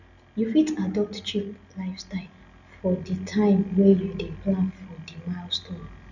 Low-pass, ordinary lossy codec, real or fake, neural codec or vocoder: 7.2 kHz; none; real; none